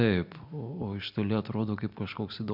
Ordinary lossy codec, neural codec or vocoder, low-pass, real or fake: MP3, 48 kbps; none; 5.4 kHz; real